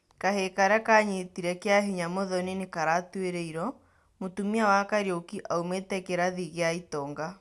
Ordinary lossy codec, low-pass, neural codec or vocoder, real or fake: none; none; none; real